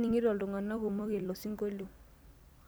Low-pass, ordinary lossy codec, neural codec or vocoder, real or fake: none; none; vocoder, 44.1 kHz, 128 mel bands every 256 samples, BigVGAN v2; fake